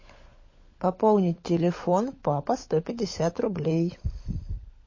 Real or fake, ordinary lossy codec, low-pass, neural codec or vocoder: fake; MP3, 32 kbps; 7.2 kHz; codec, 16 kHz, 16 kbps, FunCodec, trained on LibriTTS, 50 frames a second